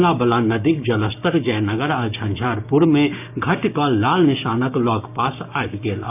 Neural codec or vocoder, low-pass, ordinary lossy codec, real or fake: codec, 16 kHz, 6 kbps, DAC; 3.6 kHz; none; fake